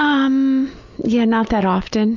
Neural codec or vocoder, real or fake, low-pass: none; real; 7.2 kHz